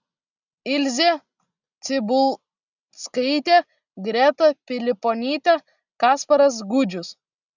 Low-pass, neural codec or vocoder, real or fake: 7.2 kHz; none; real